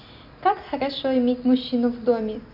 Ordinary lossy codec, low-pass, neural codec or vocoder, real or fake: none; 5.4 kHz; none; real